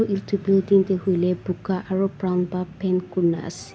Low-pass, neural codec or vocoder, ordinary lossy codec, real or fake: none; none; none; real